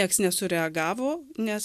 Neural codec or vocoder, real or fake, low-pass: none; real; 14.4 kHz